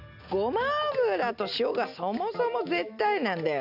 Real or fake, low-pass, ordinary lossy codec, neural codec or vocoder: real; 5.4 kHz; none; none